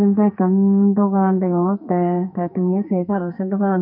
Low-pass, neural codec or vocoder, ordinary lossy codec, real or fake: 5.4 kHz; codec, 44.1 kHz, 2.6 kbps, SNAC; none; fake